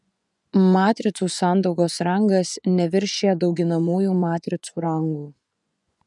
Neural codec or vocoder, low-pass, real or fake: vocoder, 24 kHz, 100 mel bands, Vocos; 10.8 kHz; fake